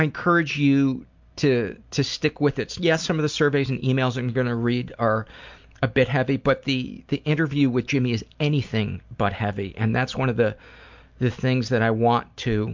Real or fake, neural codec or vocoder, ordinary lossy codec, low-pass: real; none; MP3, 64 kbps; 7.2 kHz